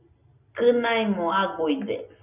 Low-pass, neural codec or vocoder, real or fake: 3.6 kHz; none; real